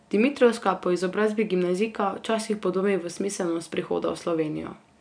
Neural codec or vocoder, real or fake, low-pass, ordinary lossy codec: none; real; 9.9 kHz; none